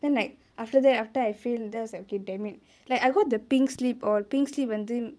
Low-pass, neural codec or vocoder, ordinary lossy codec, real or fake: none; vocoder, 22.05 kHz, 80 mel bands, Vocos; none; fake